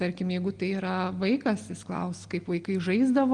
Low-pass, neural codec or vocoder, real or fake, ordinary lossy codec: 9.9 kHz; none; real; Opus, 24 kbps